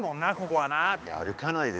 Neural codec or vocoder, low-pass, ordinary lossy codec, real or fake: codec, 16 kHz, 2 kbps, X-Codec, HuBERT features, trained on balanced general audio; none; none; fake